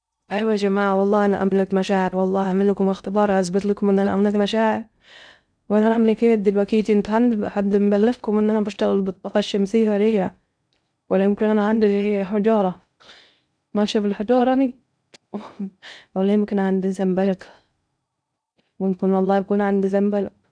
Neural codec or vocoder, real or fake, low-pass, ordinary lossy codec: codec, 16 kHz in and 24 kHz out, 0.6 kbps, FocalCodec, streaming, 2048 codes; fake; 9.9 kHz; none